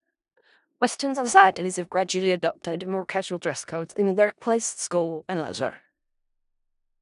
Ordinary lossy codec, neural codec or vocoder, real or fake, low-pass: none; codec, 16 kHz in and 24 kHz out, 0.4 kbps, LongCat-Audio-Codec, four codebook decoder; fake; 10.8 kHz